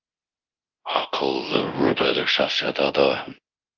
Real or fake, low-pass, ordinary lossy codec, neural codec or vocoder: fake; 7.2 kHz; Opus, 24 kbps; codec, 24 kHz, 0.9 kbps, DualCodec